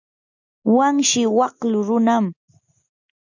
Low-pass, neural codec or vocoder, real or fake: 7.2 kHz; none; real